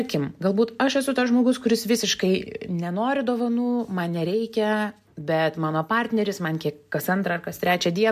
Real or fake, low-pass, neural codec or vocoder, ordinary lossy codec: real; 14.4 kHz; none; MP3, 96 kbps